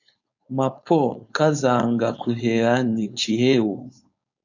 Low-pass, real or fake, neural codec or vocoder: 7.2 kHz; fake; codec, 16 kHz, 4.8 kbps, FACodec